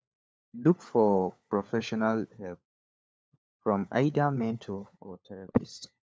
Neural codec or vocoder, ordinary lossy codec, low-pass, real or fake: codec, 16 kHz, 4 kbps, FunCodec, trained on LibriTTS, 50 frames a second; none; none; fake